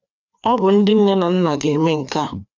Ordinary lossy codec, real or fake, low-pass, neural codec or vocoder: none; fake; 7.2 kHz; codec, 16 kHz, 2 kbps, FreqCodec, larger model